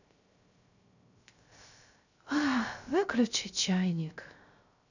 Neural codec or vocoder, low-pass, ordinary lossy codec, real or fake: codec, 16 kHz, 0.3 kbps, FocalCodec; 7.2 kHz; none; fake